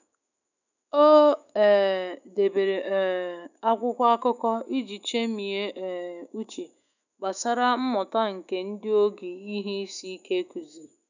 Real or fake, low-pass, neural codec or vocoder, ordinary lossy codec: fake; 7.2 kHz; autoencoder, 48 kHz, 128 numbers a frame, DAC-VAE, trained on Japanese speech; none